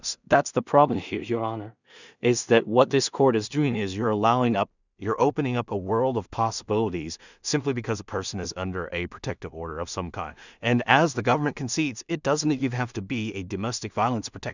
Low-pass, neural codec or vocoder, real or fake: 7.2 kHz; codec, 16 kHz in and 24 kHz out, 0.4 kbps, LongCat-Audio-Codec, two codebook decoder; fake